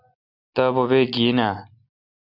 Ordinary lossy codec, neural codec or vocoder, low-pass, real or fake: MP3, 48 kbps; none; 5.4 kHz; real